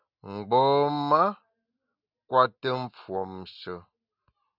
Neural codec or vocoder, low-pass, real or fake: none; 5.4 kHz; real